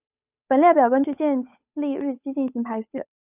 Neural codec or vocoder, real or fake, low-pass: codec, 16 kHz, 8 kbps, FunCodec, trained on Chinese and English, 25 frames a second; fake; 3.6 kHz